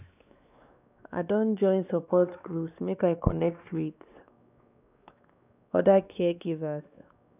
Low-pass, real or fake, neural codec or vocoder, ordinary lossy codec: 3.6 kHz; fake; codec, 16 kHz, 2 kbps, X-Codec, WavLM features, trained on Multilingual LibriSpeech; none